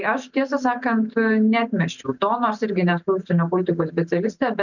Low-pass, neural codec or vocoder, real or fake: 7.2 kHz; none; real